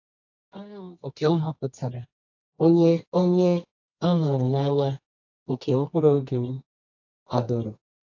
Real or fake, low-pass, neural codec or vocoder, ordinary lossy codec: fake; 7.2 kHz; codec, 24 kHz, 0.9 kbps, WavTokenizer, medium music audio release; AAC, 48 kbps